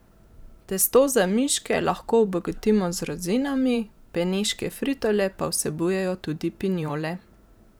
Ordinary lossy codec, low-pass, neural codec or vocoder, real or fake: none; none; vocoder, 44.1 kHz, 128 mel bands every 512 samples, BigVGAN v2; fake